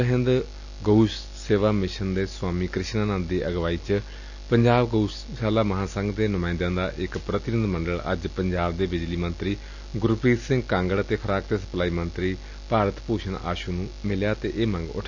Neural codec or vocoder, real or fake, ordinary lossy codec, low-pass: none; real; none; 7.2 kHz